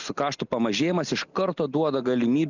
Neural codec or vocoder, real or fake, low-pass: none; real; 7.2 kHz